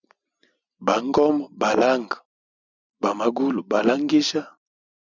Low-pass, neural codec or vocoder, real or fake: 7.2 kHz; none; real